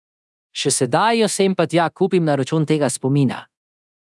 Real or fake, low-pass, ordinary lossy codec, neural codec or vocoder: fake; none; none; codec, 24 kHz, 0.9 kbps, DualCodec